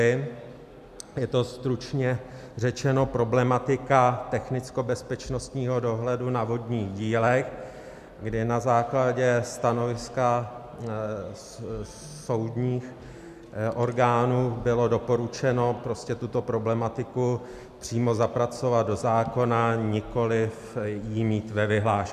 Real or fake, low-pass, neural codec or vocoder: real; 14.4 kHz; none